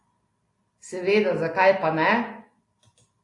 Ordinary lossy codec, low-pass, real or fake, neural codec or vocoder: AAC, 48 kbps; 10.8 kHz; real; none